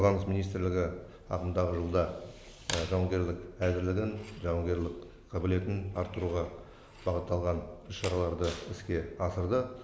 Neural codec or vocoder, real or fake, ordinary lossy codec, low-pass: none; real; none; none